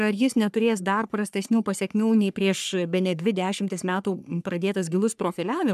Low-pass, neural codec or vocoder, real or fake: 14.4 kHz; codec, 44.1 kHz, 3.4 kbps, Pupu-Codec; fake